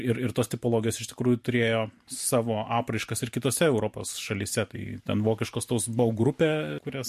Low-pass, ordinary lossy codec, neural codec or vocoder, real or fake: 14.4 kHz; MP3, 64 kbps; vocoder, 44.1 kHz, 128 mel bands every 512 samples, BigVGAN v2; fake